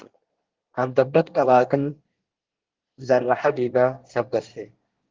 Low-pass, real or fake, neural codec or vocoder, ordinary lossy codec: 7.2 kHz; fake; codec, 24 kHz, 1 kbps, SNAC; Opus, 16 kbps